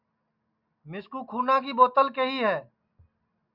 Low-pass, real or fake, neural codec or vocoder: 5.4 kHz; real; none